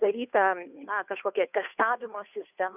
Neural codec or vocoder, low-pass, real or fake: codec, 16 kHz, 2 kbps, FunCodec, trained on Chinese and English, 25 frames a second; 3.6 kHz; fake